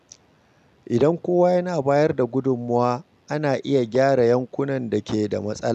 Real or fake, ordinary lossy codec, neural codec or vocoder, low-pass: real; none; none; 14.4 kHz